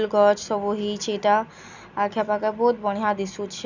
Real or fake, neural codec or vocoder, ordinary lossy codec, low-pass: real; none; none; 7.2 kHz